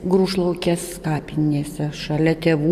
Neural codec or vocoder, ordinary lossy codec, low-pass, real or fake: none; AAC, 96 kbps; 14.4 kHz; real